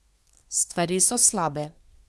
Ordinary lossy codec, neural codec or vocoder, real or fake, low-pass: none; codec, 24 kHz, 1 kbps, SNAC; fake; none